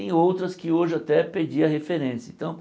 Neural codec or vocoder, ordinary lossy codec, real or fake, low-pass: none; none; real; none